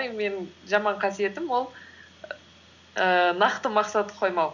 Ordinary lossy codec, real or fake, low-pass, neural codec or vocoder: none; real; 7.2 kHz; none